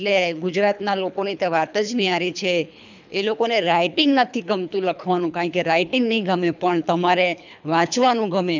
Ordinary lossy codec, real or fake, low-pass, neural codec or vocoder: none; fake; 7.2 kHz; codec, 24 kHz, 3 kbps, HILCodec